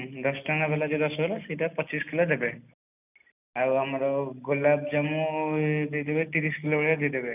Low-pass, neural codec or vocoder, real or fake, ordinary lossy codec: 3.6 kHz; none; real; none